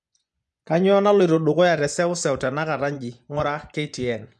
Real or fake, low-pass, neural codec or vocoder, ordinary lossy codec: fake; none; vocoder, 24 kHz, 100 mel bands, Vocos; none